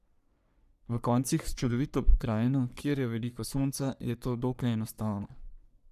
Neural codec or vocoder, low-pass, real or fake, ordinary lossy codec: codec, 44.1 kHz, 3.4 kbps, Pupu-Codec; 14.4 kHz; fake; none